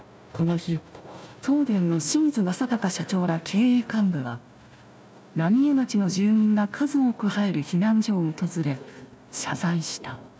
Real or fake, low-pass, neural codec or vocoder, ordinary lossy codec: fake; none; codec, 16 kHz, 1 kbps, FunCodec, trained on Chinese and English, 50 frames a second; none